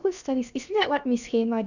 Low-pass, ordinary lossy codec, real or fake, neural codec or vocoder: 7.2 kHz; none; fake; codec, 16 kHz, about 1 kbps, DyCAST, with the encoder's durations